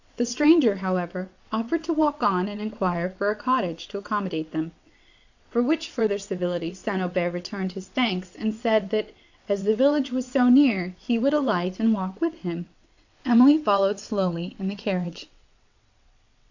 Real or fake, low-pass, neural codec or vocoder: fake; 7.2 kHz; vocoder, 22.05 kHz, 80 mel bands, WaveNeXt